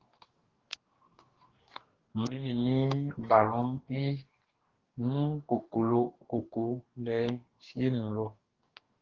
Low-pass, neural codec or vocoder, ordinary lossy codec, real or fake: 7.2 kHz; codec, 44.1 kHz, 2.6 kbps, DAC; Opus, 16 kbps; fake